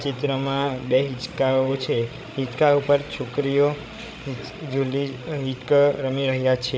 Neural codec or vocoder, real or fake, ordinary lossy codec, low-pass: codec, 16 kHz, 16 kbps, FreqCodec, larger model; fake; none; none